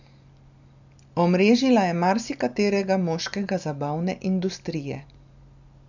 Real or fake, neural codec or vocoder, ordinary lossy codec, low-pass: real; none; none; 7.2 kHz